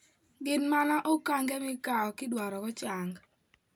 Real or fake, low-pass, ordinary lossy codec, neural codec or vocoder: real; none; none; none